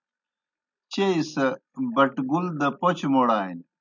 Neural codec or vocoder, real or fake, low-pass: none; real; 7.2 kHz